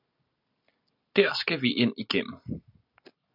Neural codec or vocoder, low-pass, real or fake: none; 5.4 kHz; real